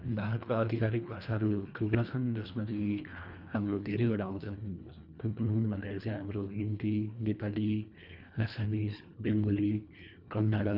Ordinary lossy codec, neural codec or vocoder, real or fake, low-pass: none; codec, 24 kHz, 1.5 kbps, HILCodec; fake; 5.4 kHz